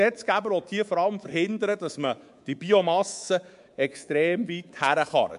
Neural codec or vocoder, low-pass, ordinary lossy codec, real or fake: codec, 24 kHz, 3.1 kbps, DualCodec; 10.8 kHz; MP3, 64 kbps; fake